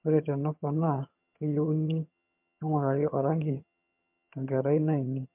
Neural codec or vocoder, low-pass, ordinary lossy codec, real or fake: vocoder, 22.05 kHz, 80 mel bands, HiFi-GAN; 3.6 kHz; MP3, 32 kbps; fake